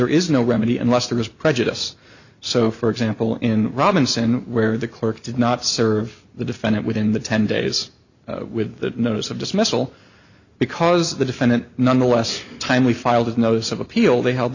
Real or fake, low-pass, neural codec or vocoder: real; 7.2 kHz; none